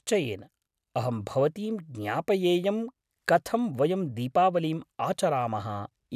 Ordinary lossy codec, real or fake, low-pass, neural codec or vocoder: none; real; 14.4 kHz; none